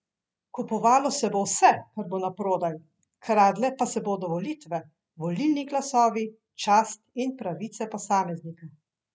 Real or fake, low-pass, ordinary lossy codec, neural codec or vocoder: real; none; none; none